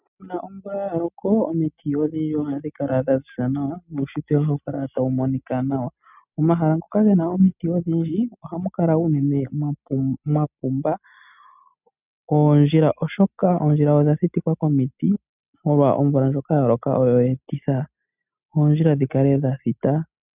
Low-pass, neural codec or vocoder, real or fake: 3.6 kHz; none; real